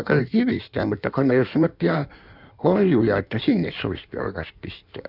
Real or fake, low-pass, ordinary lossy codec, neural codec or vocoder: fake; 5.4 kHz; none; codec, 16 kHz in and 24 kHz out, 1.1 kbps, FireRedTTS-2 codec